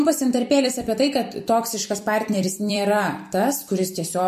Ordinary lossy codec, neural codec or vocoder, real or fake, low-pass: MP3, 64 kbps; vocoder, 44.1 kHz, 128 mel bands every 256 samples, BigVGAN v2; fake; 14.4 kHz